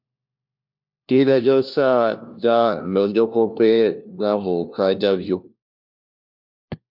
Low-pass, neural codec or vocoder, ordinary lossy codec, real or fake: 5.4 kHz; codec, 16 kHz, 1 kbps, FunCodec, trained on LibriTTS, 50 frames a second; MP3, 48 kbps; fake